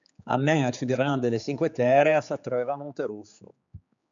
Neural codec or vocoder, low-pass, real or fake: codec, 16 kHz, 4 kbps, X-Codec, HuBERT features, trained on general audio; 7.2 kHz; fake